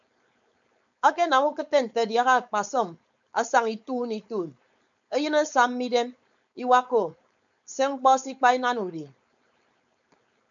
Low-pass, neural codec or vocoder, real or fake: 7.2 kHz; codec, 16 kHz, 4.8 kbps, FACodec; fake